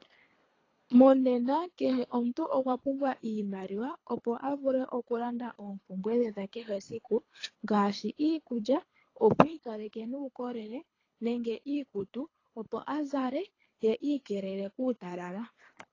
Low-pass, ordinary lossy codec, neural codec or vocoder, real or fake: 7.2 kHz; AAC, 32 kbps; codec, 24 kHz, 3 kbps, HILCodec; fake